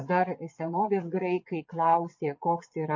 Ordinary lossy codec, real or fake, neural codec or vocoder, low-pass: MP3, 48 kbps; fake; vocoder, 22.05 kHz, 80 mel bands, Vocos; 7.2 kHz